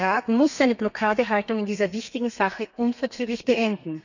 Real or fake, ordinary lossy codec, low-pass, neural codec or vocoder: fake; none; 7.2 kHz; codec, 32 kHz, 1.9 kbps, SNAC